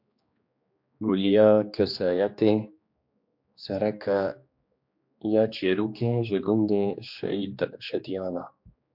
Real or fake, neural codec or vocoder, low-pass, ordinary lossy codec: fake; codec, 16 kHz, 2 kbps, X-Codec, HuBERT features, trained on general audio; 5.4 kHz; MP3, 48 kbps